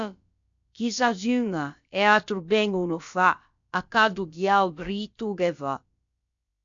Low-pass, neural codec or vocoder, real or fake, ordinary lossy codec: 7.2 kHz; codec, 16 kHz, about 1 kbps, DyCAST, with the encoder's durations; fake; MP3, 64 kbps